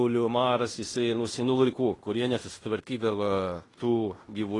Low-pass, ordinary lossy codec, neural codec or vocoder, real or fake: 10.8 kHz; AAC, 32 kbps; codec, 16 kHz in and 24 kHz out, 0.9 kbps, LongCat-Audio-Codec, fine tuned four codebook decoder; fake